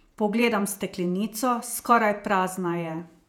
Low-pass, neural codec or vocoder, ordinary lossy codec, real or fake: 19.8 kHz; vocoder, 48 kHz, 128 mel bands, Vocos; none; fake